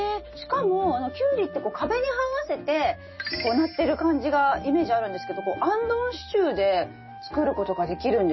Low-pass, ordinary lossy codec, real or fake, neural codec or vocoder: 7.2 kHz; MP3, 24 kbps; real; none